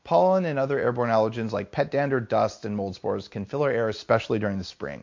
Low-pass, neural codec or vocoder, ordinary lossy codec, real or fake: 7.2 kHz; none; MP3, 48 kbps; real